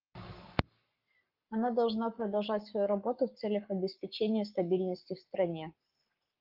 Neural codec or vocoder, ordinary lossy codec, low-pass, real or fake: codec, 44.1 kHz, 7.8 kbps, Pupu-Codec; Opus, 32 kbps; 5.4 kHz; fake